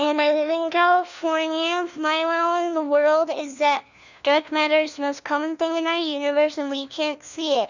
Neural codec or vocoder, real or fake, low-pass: codec, 16 kHz, 1 kbps, FunCodec, trained on LibriTTS, 50 frames a second; fake; 7.2 kHz